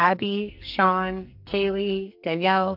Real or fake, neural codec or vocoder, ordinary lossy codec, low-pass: fake; codec, 44.1 kHz, 2.6 kbps, SNAC; AAC, 48 kbps; 5.4 kHz